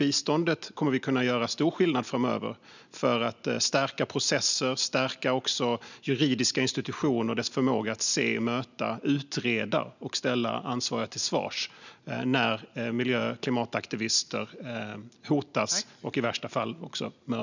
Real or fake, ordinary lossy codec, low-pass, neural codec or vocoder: real; none; 7.2 kHz; none